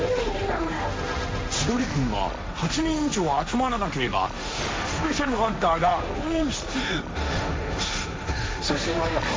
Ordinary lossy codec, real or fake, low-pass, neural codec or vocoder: none; fake; none; codec, 16 kHz, 1.1 kbps, Voila-Tokenizer